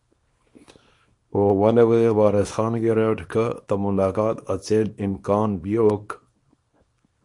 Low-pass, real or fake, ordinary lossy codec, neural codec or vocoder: 10.8 kHz; fake; MP3, 48 kbps; codec, 24 kHz, 0.9 kbps, WavTokenizer, small release